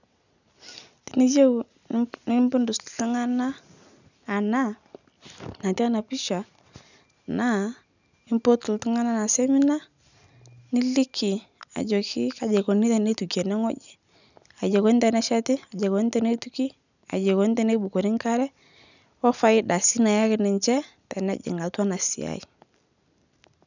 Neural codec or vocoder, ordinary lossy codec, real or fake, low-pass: none; none; real; 7.2 kHz